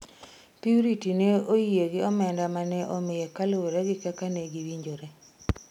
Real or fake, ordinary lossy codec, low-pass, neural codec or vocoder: real; none; 19.8 kHz; none